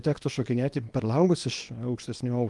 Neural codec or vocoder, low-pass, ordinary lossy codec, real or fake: codec, 24 kHz, 0.9 kbps, WavTokenizer, small release; 10.8 kHz; Opus, 32 kbps; fake